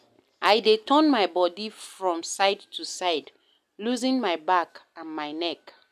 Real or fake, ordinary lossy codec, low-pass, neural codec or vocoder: real; none; 14.4 kHz; none